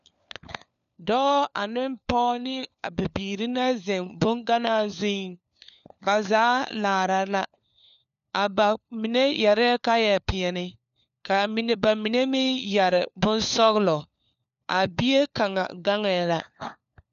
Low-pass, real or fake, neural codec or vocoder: 7.2 kHz; fake; codec, 16 kHz, 4 kbps, FunCodec, trained on LibriTTS, 50 frames a second